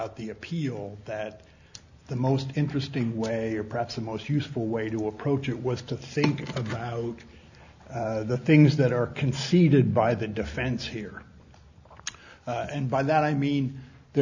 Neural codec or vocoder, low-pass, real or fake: none; 7.2 kHz; real